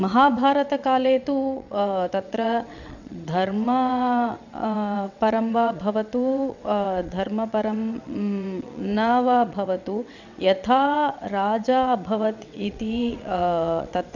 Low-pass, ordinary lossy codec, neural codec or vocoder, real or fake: 7.2 kHz; none; vocoder, 22.05 kHz, 80 mel bands, WaveNeXt; fake